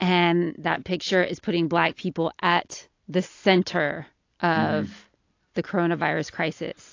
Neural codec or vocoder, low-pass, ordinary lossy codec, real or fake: none; 7.2 kHz; AAC, 48 kbps; real